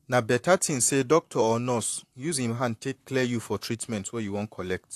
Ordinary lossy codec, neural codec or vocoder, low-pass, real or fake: AAC, 64 kbps; vocoder, 44.1 kHz, 128 mel bands, Pupu-Vocoder; 14.4 kHz; fake